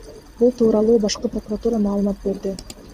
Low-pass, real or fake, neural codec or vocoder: 14.4 kHz; fake; vocoder, 44.1 kHz, 128 mel bands every 512 samples, BigVGAN v2